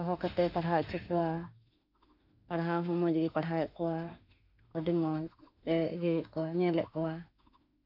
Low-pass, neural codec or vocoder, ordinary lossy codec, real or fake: 5.4 kHz; autoencoder, 48 kHz, 32 numbers a frame, DAC-VAE, trained on Japanese speech; none; fake